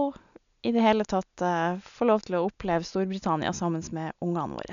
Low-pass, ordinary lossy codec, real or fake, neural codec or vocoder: 7.2 kHz; none; real; none